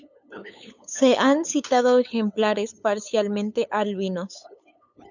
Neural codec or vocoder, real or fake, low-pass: codec, 16 kHz, 8 kbps, FunCodec, trained on LibriTTS, 25 frames a second; fake; 7.2 kHz